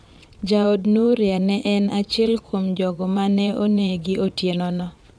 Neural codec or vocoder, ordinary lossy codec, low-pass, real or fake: vocoder, 22.05 kHz, 80 mel bands, Vocos; none; none; fake